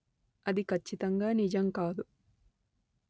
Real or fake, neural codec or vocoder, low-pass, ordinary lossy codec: real; none; none; none